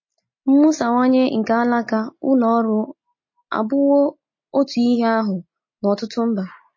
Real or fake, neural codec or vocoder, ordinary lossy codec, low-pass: real; none; MP3, 32 kbps; 7.2 kHz